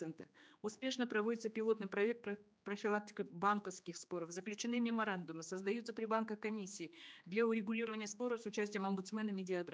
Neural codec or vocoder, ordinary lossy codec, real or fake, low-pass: codec, 16 kHz, 2 kbps, X-Codec, HuBERT features, trained on general audio; none; fake; none